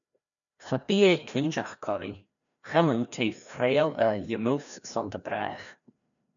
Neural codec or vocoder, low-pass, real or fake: codec, 16 kHz, 1 kbps, FreqCodec, larger model; 7.2 kHz; fake